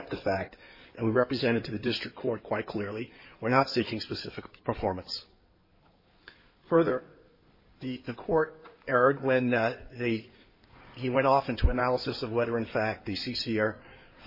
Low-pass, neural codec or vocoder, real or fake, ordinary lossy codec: 5.4 kHz; codec, 16 kHz in and 24 kHz out, 2.2 kbps, FireRedTTS-2 codec; fake; MP3, 24 kbps